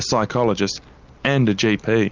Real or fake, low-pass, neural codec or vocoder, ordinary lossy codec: real; 7.2 kHz; none; Opus, 24 kbps